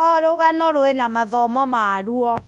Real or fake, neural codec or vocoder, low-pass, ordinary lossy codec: fake; codec, 24 kHz, 0.9 kbps, WavTokenizer, large speech release; 10.8 kHz; none